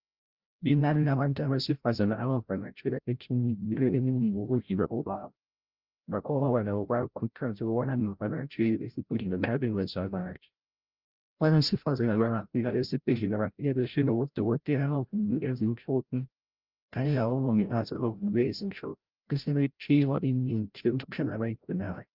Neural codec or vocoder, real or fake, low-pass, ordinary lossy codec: codec, 16 kHz, 0.5 kbps, FreqCodec, larger model; fake; 5.4 kHz; Opus, 32 kbps